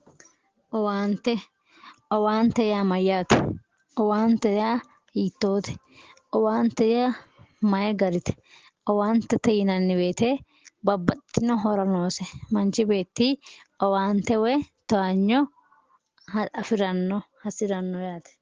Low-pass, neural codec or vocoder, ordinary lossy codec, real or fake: 7.2 kHz; none; Opus, 16 kbps; real